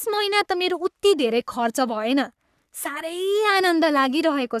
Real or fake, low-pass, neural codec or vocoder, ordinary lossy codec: fake; 14.4 kHz; codec, 44.1 kHz, 3.4 kbps, Pupu-Codec; none